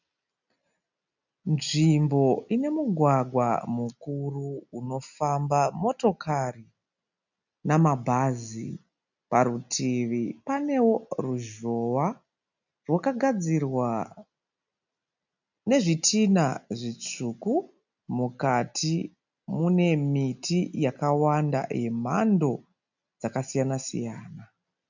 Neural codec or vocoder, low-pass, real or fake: none; 7.2 kHz; real